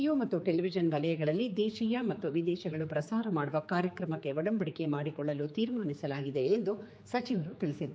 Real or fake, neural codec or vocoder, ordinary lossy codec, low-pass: fake; codec, 16 kHz, 4 kbps, X-Codec, HuBERT features, trained on general audio; none; none